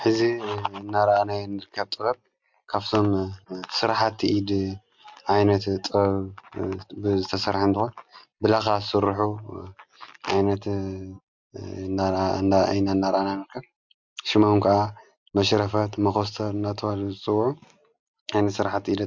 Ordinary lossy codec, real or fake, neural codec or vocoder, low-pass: MP3, 64 kbps; real; none; 7.2 kHz